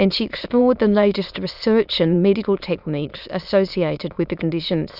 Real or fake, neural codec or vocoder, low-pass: fake; autoencoder, 22.05 kHz, a latent of 192 numbers a frame, VITS, trained on many speakers; 5.4 kHz